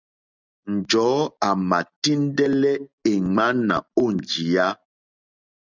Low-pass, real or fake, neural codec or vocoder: 7.2 kHz; real; none